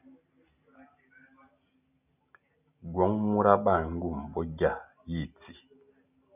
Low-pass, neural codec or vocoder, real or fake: 3.6 kHz; none; real